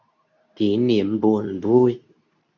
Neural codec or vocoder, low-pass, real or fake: codec, 24 kHz, 0.9 kbps, WavTokenizer, medium speech release version 2; 7.2 kHz; fake